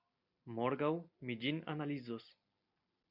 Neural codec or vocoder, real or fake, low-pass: none; real; 5.4 kHz